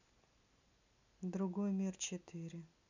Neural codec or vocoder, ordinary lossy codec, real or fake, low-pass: none; none; real; 7.2 kHz